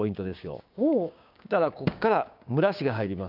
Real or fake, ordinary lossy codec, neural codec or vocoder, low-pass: fake; none; codec, 16 kHz, 6 kbps, DAC; 5.4 kHz